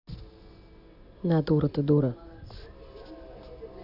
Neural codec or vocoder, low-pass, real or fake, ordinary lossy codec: none; 5.4 kHz; real; none